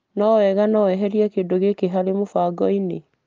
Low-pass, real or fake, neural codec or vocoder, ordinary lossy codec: 7.2 kHz; real; none; Opus, 24 kbps